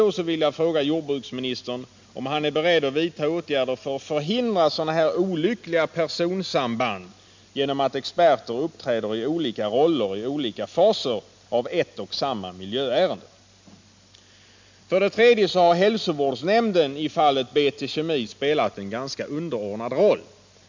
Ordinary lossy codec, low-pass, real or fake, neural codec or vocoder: MP3, 64 kbps; 7.2 kHz; real; none